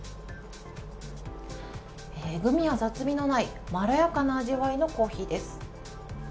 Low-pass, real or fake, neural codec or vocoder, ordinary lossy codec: none; real; none; none